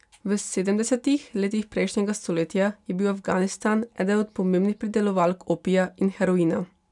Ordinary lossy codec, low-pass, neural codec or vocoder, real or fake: none; 10.8 kHz; none; real